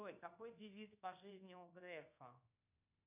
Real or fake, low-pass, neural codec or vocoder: fake; 3.6 kHz; codec, 24 kHz, 1.2 kbps, DualCodec